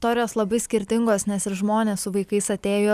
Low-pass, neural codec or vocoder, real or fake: 14.4 kHz; vocoder, 44.1 kHz, 128 mel bands every 256 samples, BigVGAN v2; fake